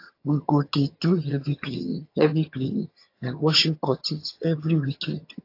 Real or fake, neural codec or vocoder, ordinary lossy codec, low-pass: fake; vocoder, 22.05 kHz, 80 mel bands, HiFi-GAN; AAC, 32 kbps; 5.4 kHz